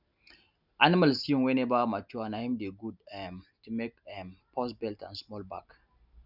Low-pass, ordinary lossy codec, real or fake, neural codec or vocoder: 5.4 kHz; none; real; none